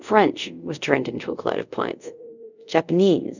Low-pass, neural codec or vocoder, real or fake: 7.2 kHz; codec, 24 kHz, 0.5 kbps, DualCodec; fake